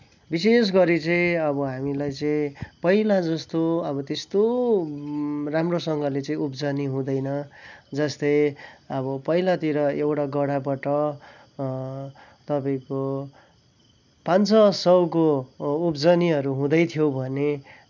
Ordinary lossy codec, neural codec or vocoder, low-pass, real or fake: none; none; 7.2 kHz; real